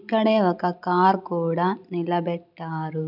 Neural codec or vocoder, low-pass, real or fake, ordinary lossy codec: none; 5.4 kHz; real; none